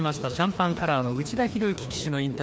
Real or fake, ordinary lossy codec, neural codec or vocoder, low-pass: fake; none; codec, 16 kHz, 2 kbps, FreqCodec, larger model; none